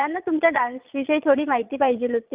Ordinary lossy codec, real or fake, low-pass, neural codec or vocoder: Opus, 32 kbps; fake; 3.6 kHz; vocoder, 22.05 kHz, 80 mel bands, Vocos